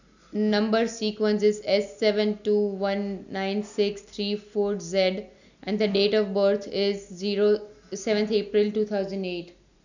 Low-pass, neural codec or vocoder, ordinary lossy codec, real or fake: 7.2 kHz; none; none; real